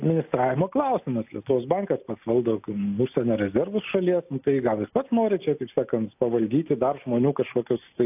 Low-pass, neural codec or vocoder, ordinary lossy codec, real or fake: 3.6 kHz; none; AAC, 32 kbps; real